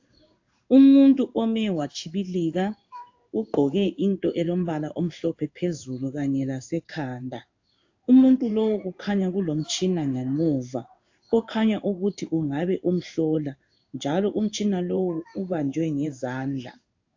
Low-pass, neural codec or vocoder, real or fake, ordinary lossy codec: 7.2 kHz; codec, 16 kHz in and 24 kHz out, 1 kbps, XY-Tokenizer; fake; AAC, 48 kbps